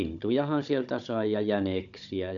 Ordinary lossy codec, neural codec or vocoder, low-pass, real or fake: MP3, 96 kbps; codec, 16 kHz, 16 kbps, FunCodec, trained on Chinese and English, 50 frames a second; 7.2 kHz; fake